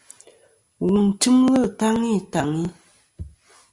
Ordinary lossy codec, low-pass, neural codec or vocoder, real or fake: Opus, 64 kbps; 10.8 kHz; none; real